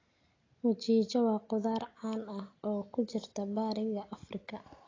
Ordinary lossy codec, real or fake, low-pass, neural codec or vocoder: AAC, 32 kbps; real; 7.2 kHz; none